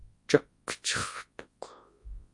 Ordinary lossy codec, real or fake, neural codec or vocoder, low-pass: MP3, 48 kbps; fake; codec, 24 kHz, 0.9 kbps, WavTokenizer, large speech release; 10.8 kHz